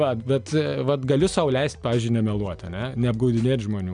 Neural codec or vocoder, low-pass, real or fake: none; 9.9 kHz; real